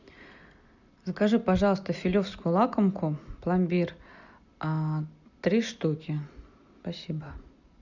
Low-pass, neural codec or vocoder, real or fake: 7.2 kHz; none; real